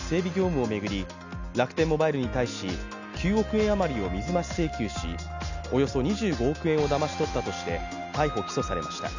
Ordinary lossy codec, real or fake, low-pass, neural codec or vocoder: none; real; 7.2 kHz; none